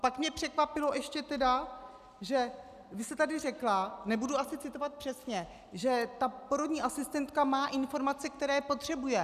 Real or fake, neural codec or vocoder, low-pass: real; none; 14.4 kHz